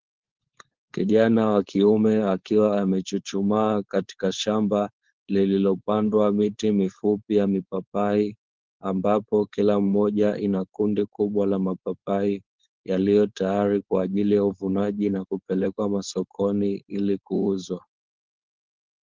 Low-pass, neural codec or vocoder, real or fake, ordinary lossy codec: 7.2 kHz; codec, 16 kHz, 4.8 kbps, FACodec; fake; Opus, 16 kbps